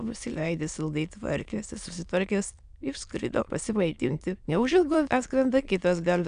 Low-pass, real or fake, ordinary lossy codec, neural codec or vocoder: 9.9 kHz; fake; AAC, 96 kbps; autoencoder, 22.05 kHz, a latent of 192 numbers a frame, VITS, trained on many speakers